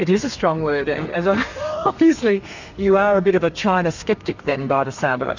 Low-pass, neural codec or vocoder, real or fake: 7.2 kHz; codec, 32 kHz, 1.9 kbps, SNAC; fake